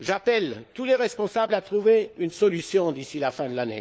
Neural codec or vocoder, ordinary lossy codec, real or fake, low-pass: codec, 16 kHz, 4 kbps, FunCodec, trained on LibriTTS, 50 frames a second; none; fake; none